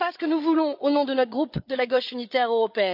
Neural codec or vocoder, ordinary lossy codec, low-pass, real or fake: codec, 16 kHz, 8 kbps, FreqCodec, larger model; none; 5.4 kHz; fake